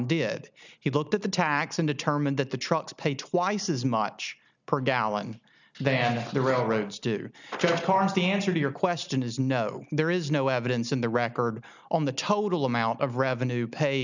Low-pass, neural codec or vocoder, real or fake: 7.2 kHz; none; real